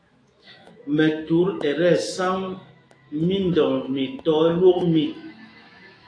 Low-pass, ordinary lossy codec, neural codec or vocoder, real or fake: 9.9 kHz; AAC, 32 kbps; autoencoder, 48 kHz, 128 numbers a frame, DAC-VAE, trained on Japanese speech; fake